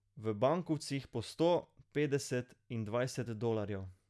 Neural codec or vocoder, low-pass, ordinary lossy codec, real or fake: none; none; none; real